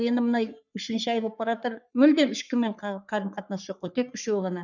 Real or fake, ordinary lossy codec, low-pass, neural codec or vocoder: fake; none; 7.2 kHz; codec, 44.1 kHz, 3.4 kbps, Pupu-Codec